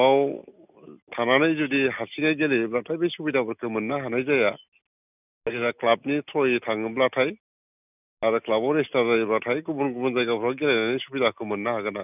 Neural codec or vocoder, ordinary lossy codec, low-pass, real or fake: none; none; 3.6 kHz; real